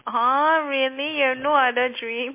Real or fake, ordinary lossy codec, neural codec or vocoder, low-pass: real; MP3, 24 kbps; none; 3.6 kHz